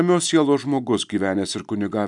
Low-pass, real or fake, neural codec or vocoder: 10.8 kHz; real; none